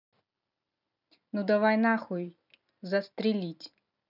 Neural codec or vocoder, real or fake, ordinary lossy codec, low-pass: none; real; none; 5.4 kHz